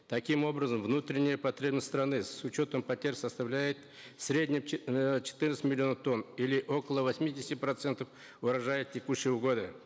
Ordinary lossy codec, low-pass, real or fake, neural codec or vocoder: none; none; real; none